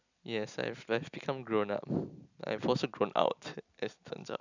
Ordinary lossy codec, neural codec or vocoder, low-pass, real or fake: none; none; 7.2 kHz; real